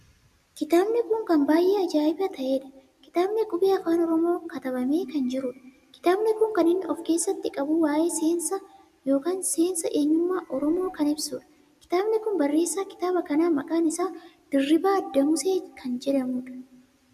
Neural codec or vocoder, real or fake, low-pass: none; real; 14.4 kHz